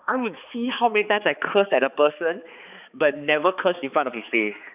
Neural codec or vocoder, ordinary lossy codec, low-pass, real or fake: codec, 16 kHz, 4 kbps, X-Codec, HuBERT features, trained on balanced general audio; none; 3.6 kHz; fake